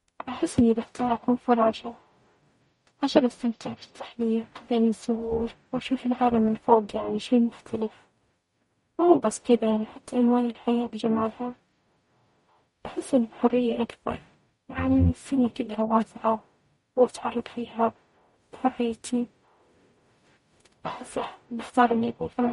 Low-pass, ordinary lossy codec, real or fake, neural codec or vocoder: 19.8 kHz; MP3, 48 kbps; fake; codec, 44.1 kHz, 0.9 kbps, DAC